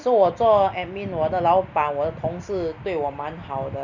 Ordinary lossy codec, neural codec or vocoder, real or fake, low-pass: none; none; real; 7.2 kHz